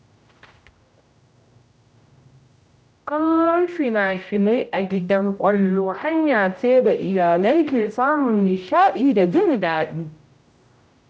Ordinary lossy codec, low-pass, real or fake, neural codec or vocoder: none; none; fake; codec, 16 kHz, 0.5 kbps, X-Codec, HuBERT features, trained on general audio